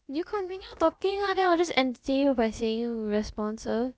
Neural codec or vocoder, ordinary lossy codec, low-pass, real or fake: codec, 16 kHz, about 1 kbps, DyCAST, with the encoder's durations; none; none; fake